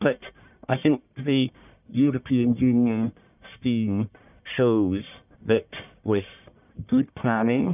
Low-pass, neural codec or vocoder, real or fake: 3.6 kHz; codec, 44.1 kHz, 1.7 kbps, Pupu-Codec; fake